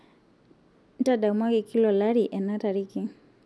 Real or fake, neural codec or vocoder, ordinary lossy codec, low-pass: real; none; none; none